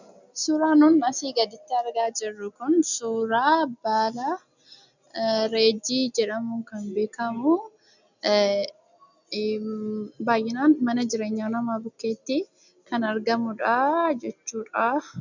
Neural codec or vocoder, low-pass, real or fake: none; 7.2 kHz; real